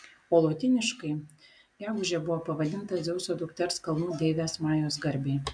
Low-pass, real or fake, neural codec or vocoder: 9.9 kHz; real; none